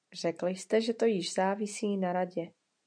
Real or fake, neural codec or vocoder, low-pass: real; none; 9.9 kHz